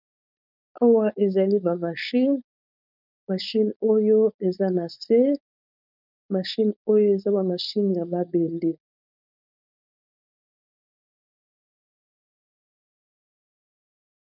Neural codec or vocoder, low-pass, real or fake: codec, 16 kHz, 4.8 kbps, FACodec; 5.4 kHz; fake